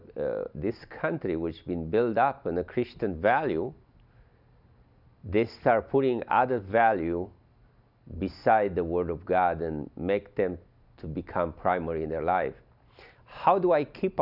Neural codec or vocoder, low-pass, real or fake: none; 5.4 kHz; real